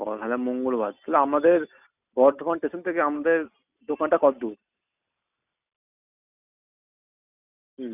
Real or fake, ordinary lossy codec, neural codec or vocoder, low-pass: real; none; none; 3.6 kHz